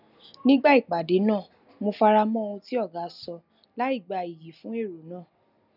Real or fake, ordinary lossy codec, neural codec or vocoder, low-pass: real; none; none; 5.4 kHz